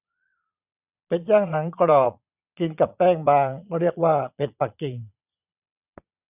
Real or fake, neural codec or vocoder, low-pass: fake; vocoder, 22.05 kHz, 80 mel bands, Vocos; 3.6 kHz